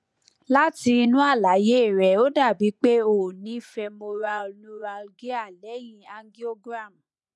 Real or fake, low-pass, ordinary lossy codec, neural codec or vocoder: fake; none; none; vocoder, 24 kHz, 100 mel bands, Vocos